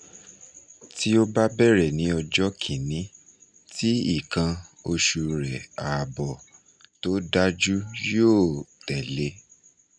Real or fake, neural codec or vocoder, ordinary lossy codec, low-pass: real; none; none; 9.9 kHz